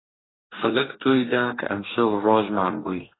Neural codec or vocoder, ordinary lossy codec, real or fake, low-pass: codec, 44.1 kHz, 2.6 kbps, DAC; AAC, 16 kbps; fake; 7.2 kHz